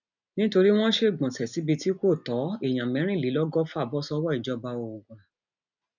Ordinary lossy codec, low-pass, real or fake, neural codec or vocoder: none; 7.2 kHz; real; none